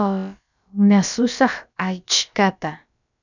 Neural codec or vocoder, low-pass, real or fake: codec, 16 kHz, about 1 kbps, DyCAST, with the encoder's durations; 7.2 kHz; fake